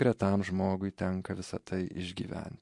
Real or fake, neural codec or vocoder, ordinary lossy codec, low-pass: fake; vocoder, 44.1 kHz, 128 mel bands every 512 samples, BigVGAN v2; MP3, 64 kbps; 10.8 kHz